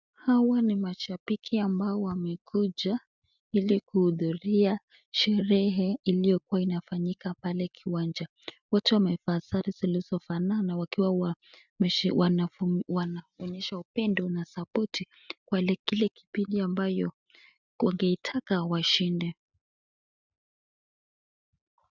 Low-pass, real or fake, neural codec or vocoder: 7.2 kHz; real; none